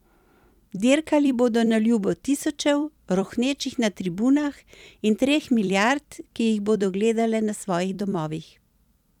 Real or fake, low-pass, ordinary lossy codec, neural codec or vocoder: fake; 19.8 kHz; none; vocoder, 44.1 kHz, 128 mel bands every 256 samples, BigVGAN v2